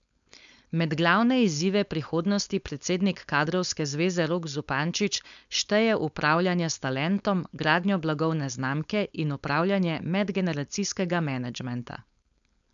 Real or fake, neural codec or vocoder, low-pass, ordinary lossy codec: fake; codec, 16 kHz, 4.8 kbps, FACodec; 7.2 kHz; none